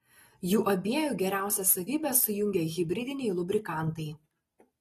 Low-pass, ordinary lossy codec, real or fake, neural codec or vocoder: 19.8 kHz; AAC, 32 kbps; real; none